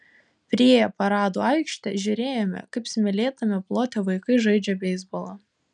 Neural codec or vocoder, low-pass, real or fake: none; 9.9 kHz; real